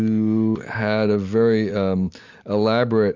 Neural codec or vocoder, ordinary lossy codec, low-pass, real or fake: none; MP3, 64 kbps; 7.2 kHz; real